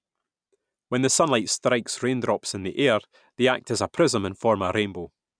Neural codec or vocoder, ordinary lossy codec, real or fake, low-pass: none; none; real; 9.9 kHz